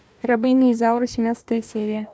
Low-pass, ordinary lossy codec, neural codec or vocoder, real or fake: none; none; codec, 16 kHz, 1 kbps, FunCodec, trained on Chinese and English, 50 frames a second; fake